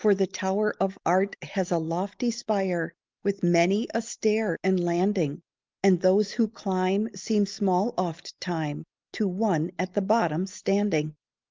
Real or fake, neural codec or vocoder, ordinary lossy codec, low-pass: real; none; Opus, 32 kbps; 7.2 kHz